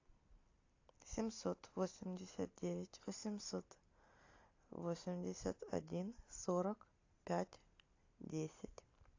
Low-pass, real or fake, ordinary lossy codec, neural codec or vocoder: 7.2 kHz; real; AAC, 48 kbps; none